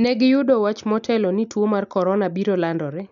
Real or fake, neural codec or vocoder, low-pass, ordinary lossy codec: real; none; 7.2 kHz; none